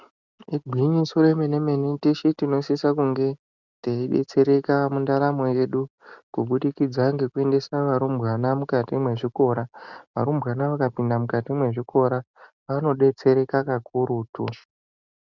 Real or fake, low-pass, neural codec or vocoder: real; 7.2 kHz; none